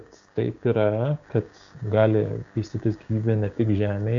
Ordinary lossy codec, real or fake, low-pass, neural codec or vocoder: AAC, 64 kbps; fake; 7.2 kHz; codec, 16 kHz, 6 kbps, DAC